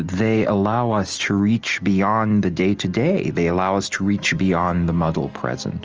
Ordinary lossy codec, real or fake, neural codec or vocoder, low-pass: Opus, 24 kbps; real; none; 7.2 kHz